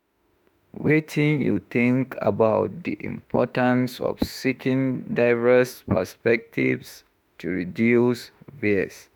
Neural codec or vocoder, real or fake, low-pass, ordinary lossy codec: autoencoder, 48 kHz, 32 numbers a frame, DAC-VAE, trained on Japanese speech; fake; none; none